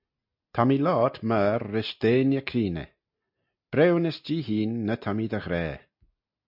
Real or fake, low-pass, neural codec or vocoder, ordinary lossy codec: real; 5.4 kHz; none; MP3, 48 kbps